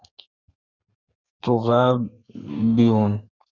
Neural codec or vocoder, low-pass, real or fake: codec, 32 kHz, 1.9 kbps, SNAC; 7.2 kHz; fake